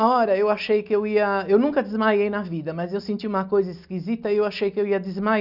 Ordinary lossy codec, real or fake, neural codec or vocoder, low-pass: none; real; none; 5.4 kHz